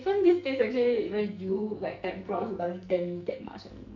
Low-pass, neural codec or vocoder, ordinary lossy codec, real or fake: 7.2 kHz; codec, 44.1 kHz, 2.6 kbps, SNAC; none; fake